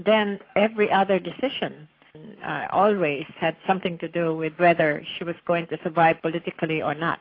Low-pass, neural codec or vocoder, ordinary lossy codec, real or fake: 5.4 kHz; codec, 44.1 kHz, 7.8 kbps, Pupu-Codec; AAC, 32 kbps; fake